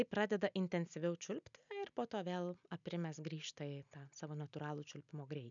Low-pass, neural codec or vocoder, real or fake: 7.2 kHz; none; real